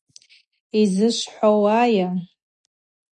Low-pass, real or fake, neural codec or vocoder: 10.8 kHz; real; none